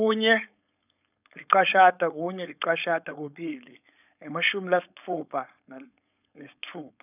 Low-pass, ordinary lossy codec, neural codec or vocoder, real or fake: 3.6 kHz; none; codec, 16 kHz, 4.8 kbps, FACodec; fake